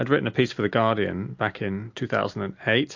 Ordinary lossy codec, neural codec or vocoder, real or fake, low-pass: MP3, 48 kbps; none; real; 7.2 kHz